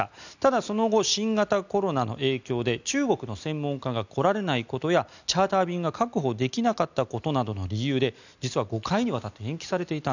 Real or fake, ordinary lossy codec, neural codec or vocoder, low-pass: real; none; none; 7.2 kHz